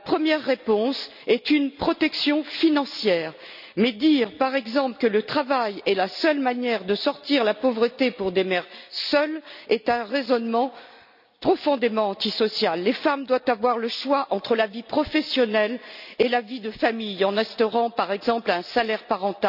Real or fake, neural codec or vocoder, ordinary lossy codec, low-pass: real; none; none; 5.4 kHz